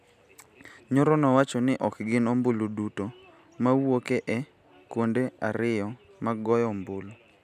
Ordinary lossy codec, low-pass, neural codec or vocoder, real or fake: none; 14.4 kHz; none; real